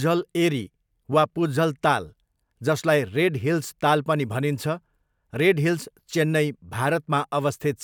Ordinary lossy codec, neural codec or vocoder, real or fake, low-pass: none; vocoder, 44.1 kHz, 128 mel bands every 256 samples, BigVGAN v2; fake; 19.8 kHz